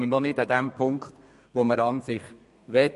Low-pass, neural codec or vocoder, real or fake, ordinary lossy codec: 14.4 kHz; codec, 32 kHz, 1.9 kbps, SNAC; fake; MP3, 48 kbps